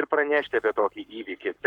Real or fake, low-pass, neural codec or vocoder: fake; 19.8 kHz; codec, 44.1 kHz, 7.8 kbps, Pupu-Codec